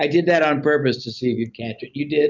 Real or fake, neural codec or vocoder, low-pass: real; none; 7.2 kHz